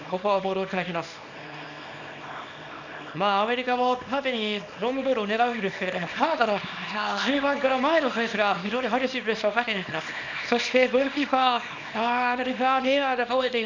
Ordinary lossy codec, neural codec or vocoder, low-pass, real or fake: none; codec, 24 kHz, 0.9 kbps, WavTokenizer, small release; 7.2 kHz; fake